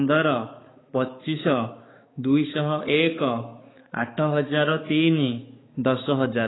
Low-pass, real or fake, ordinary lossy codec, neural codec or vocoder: 7.2 kHz; fake; AAC, 16 kbps; codec, 16 kHz, 4 kbps, X-Codec, HuBERT features, trained on balanced general audio